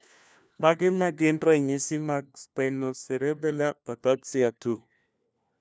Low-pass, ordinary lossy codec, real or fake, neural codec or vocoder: none; none; fake; codec, 16 kHz, 1 kbps, FunCodec, trained on LibriTTS, 50 frames a second